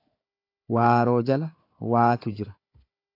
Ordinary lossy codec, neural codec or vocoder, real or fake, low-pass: AAC, 32 kbps; codec, 16 kHz, 4 kbps, FunCodec, trained on Chinese and English, 50 frames a second; fake; 5.4 kHz